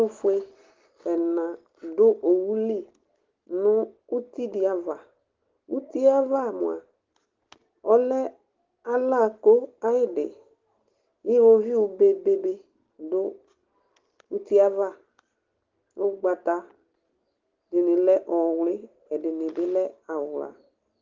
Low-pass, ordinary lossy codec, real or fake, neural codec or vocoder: 7.2 kHz; Opus, 16 kbps; real; none